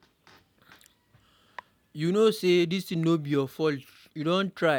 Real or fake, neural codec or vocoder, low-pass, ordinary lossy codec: real; none; 19.8 kHz; none